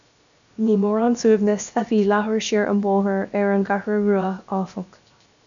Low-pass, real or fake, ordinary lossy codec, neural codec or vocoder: 7.2 kHz; fake; MP3, 96 kbps; codec, 16 kHz, 0.7 kbps, FocalCodec